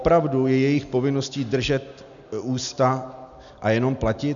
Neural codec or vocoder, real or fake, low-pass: none; real; 7.2 kHz